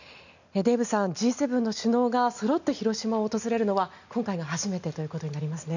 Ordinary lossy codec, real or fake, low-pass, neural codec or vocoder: none; real; 7.2 kHz; none